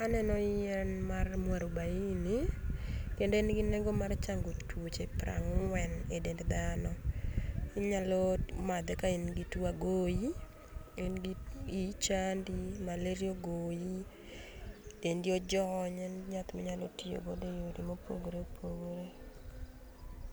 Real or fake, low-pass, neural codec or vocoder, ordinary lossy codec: real; none; none; none